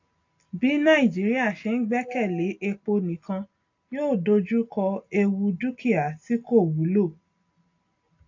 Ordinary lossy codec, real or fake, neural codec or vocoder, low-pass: AAC, 48 kbps; real; none; 7.2 kHz